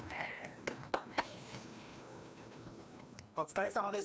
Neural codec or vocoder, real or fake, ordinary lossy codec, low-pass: codec, 16 kHz, 1 kbps, FreqCodec, larger model; fake; none; none